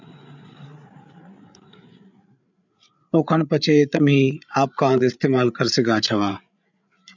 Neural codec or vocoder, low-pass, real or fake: codec, 16 kHz, 8 kbps, FreqCodec, larger model; 7.2 kHz; fake